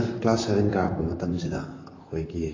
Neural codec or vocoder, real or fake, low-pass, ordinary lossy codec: vocoder, 44.1 kHz, 128 mel bands, Pupu-Vocoder; fake; 7.2 kHz; AAC, 32 kbps